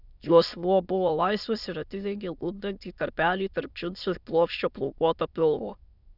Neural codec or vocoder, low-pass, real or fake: autoencoder, 22.05 kHz, a latent of 192 numbers a frame, VITS, trained on many speakers; 5.4 kHz; fake